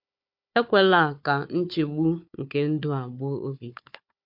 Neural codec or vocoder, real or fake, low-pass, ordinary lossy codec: codec, 16 kHz, 4 kbps, FunCodec, trained on Chinese and English, 50 frames a second; fake; 5.4 kHz; MP3, 48 kbps